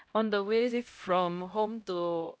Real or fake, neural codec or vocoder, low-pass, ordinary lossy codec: fake; codec, 16 kHz, 0.5 kbps, X-Codec, HuBERT features, trained on LibriSpeech; none; none